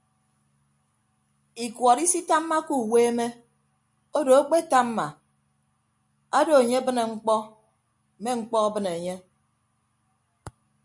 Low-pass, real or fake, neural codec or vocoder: 10.8 kHz; real; none